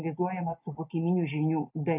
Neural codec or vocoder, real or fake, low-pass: none; real; 3.6 kHz